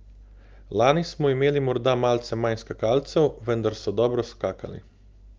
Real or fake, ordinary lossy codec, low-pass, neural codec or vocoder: real; Opus, 24 kbps; 7.2 kHz; none